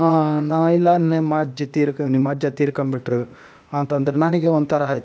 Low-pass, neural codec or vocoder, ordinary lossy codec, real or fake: none; codec, 16 kHz, 0.8 kbps, ZipCodec; none; fake